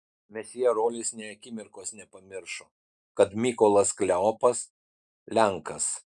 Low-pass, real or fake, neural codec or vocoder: 10.8 kHz; real; none